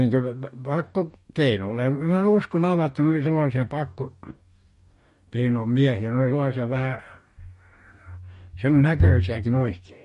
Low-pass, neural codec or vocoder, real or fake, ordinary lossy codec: 14.4 kHz; codec, 44.1 kHz, 2.6 kbps, DAC; fake; MP3, 48 kbps